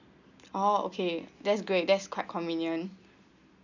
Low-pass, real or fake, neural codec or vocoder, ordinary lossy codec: 7.2 kHz; real; none; none